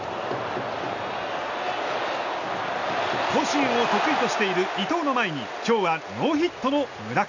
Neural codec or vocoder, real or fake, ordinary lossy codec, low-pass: none; real; none; 7.2 kHz